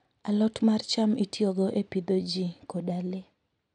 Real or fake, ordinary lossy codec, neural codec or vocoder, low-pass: real; none; none; 9.9 kHz